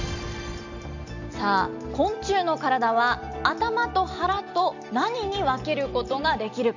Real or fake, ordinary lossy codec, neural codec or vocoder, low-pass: real; none; none; 7.2 kHz